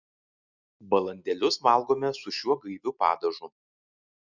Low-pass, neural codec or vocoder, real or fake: 7.2 kHz; none; real